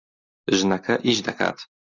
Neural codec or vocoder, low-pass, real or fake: none; 7.2 kHz; real